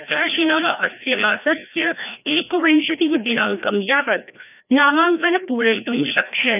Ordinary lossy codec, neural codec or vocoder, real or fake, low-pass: none; codec, 16 kHz, 1 kbps, FreqCodec, larger model; fake; 3.6 kHz